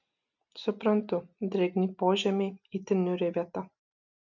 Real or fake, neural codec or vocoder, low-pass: real; none; 7.2 kHz